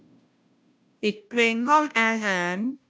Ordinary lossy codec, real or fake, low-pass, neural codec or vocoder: none; fake; none; codec, 16 kHz, 0.5 kbps, FunCodec, trained on Chinese and English, 25 frames a second